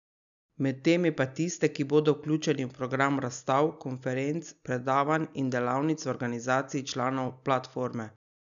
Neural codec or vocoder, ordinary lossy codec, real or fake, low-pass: none; none; real; 7.2 kHz